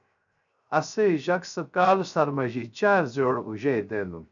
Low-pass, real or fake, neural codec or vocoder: 7.2 kHz; fake; codec, 16 kHz, 0.3 kbps, FocalCodec